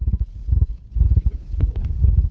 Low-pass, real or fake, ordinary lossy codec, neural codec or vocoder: none; fake; none; codec, 16 kHz, 2 kbps, FunCodec, trained on Chinese and English, 25 frames a second